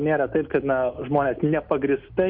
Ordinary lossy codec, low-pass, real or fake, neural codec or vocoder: MP3, 48 kbps; 5.4 kHz; real; none